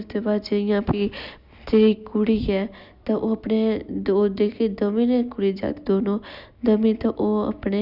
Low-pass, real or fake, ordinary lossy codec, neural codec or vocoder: 5.4 kHz; real; none; none